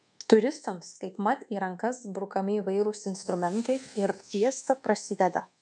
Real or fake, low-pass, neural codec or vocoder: fake; 10.8 kHz; codec, 24 kHz, 1.2 kbps, DualCodec